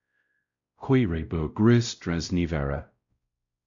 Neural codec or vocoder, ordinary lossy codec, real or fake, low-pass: codec, 16 kHz, 0.5 kbps, X-Codec, WavLM features, trained on Multilingual LibriSpeech; AAC, 64 kbps; fake; 7.2 kHz